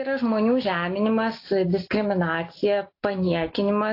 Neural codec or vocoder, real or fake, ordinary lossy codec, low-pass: none; real; AAC, 32 kbps; 5.4 kHz